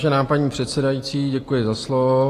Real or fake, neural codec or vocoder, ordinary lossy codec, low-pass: real; none; AAC, 48 kbps; 14.4 kHz